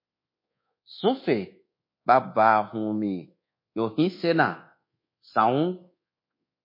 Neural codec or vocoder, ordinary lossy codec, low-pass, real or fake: codec, 24 kHz, 1.2 kbps, DualCodec; MP3, 32 kbps; 5.4 kHz; fake